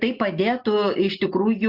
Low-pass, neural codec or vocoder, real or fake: 5.4 kHz; none; real